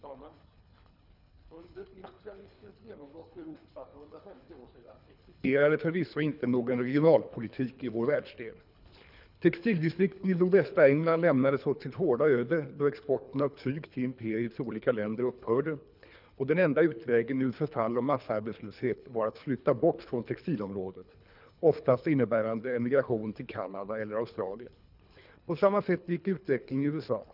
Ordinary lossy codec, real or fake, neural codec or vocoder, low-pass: none; fake; codec, 24 kHz, 3 kbps, HILCodec; 5.4 kHz